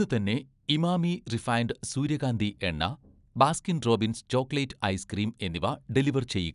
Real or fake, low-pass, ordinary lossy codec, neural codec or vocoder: real; 10.8 kHz; none; none